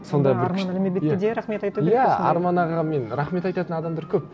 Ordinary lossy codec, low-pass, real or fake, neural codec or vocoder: none; none; real; none